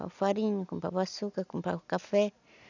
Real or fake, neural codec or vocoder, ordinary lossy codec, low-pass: real; none; none; 7.2 kHz